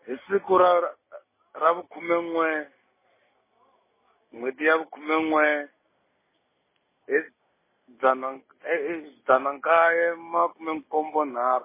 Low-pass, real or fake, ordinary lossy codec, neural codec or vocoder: 3.6 kHz; real; MP3, 16 kbps; none